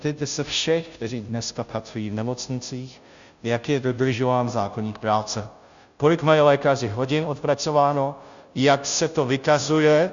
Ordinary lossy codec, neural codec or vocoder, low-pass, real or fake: Opus, 64 kbps; codec, 16 kHz, 0.5 kbps, FunCodec, trained on Chinese and English, 25 frames a second; 7.2 kHz; fake